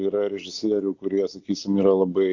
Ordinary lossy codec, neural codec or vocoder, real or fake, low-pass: AAC, 48 kbps; none; real; 7.2 kHz